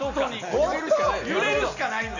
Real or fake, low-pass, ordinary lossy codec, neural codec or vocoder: real; 7.2 kHz; none; none